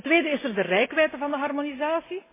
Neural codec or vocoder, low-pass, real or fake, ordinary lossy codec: none; 3.6 kHz; real; MP3, 16 kbps